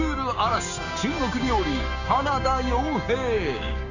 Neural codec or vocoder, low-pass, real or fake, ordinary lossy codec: codec, 16 kHz, 6 kbps, DAC; 7.2 kHz; fake; none